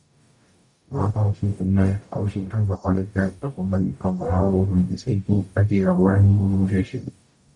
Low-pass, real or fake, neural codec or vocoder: 10.8 kHz; fake; codec, 44.1 kHz, 0.9 kbps, DAC